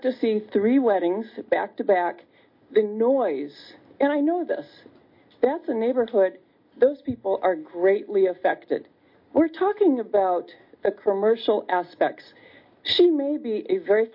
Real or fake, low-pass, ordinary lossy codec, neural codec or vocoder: real; 5.4 kHz; MP3, 32 kbps; none